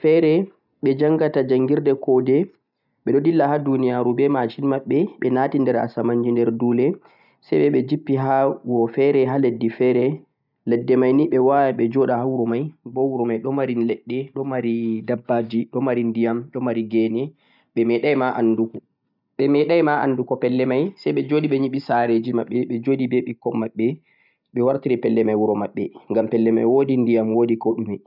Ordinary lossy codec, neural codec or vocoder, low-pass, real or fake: none; none; 5.4 kHz; real